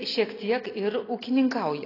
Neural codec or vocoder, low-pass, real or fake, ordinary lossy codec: none; 5.4 kHz; real; AAC, 24 kbps